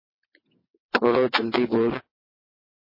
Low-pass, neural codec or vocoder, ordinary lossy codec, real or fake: 5.4 kHz; vocoder, 22.05 kHz, 80 mel bands, WaveNeXt; MP3, 24 kbps; fake